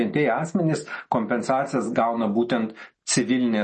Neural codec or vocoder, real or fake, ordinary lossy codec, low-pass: none; real; MP3, 32 kbps; 10.8 kHz